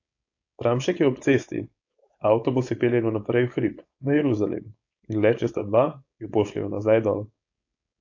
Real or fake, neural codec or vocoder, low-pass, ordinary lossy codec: fake; codec, 16 kHz, 4.8 kbps, FACodec; 7.2 kHz; AAC, 48 kbps